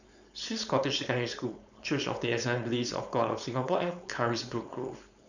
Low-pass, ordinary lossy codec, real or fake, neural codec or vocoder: 7.2 kHz; none; fake; codec, 16 kHz, 4.8 kbps, FACodec